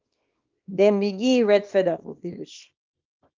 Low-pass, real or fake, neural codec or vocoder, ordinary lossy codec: 7.2 kHz; fake; codec, 24 kHz, 0.9 kbps, WavTokenizer, small release; Opus, 32 kbps